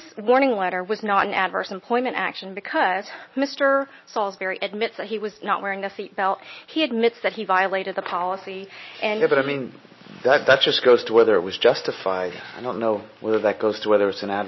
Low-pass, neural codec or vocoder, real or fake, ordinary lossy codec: 7.2 kHz; none; real; MP3, 24 kbps